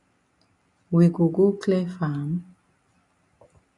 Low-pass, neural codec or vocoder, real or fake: 10.8 kHz; none; real